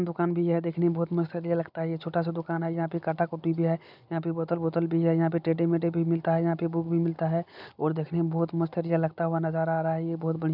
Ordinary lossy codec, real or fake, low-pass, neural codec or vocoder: none; real; 5.4 kHz; none